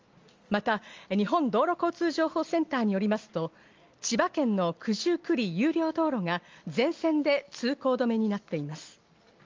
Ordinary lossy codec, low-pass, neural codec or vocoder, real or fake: Opus, 32 kbps; 7.2 kHz; none; real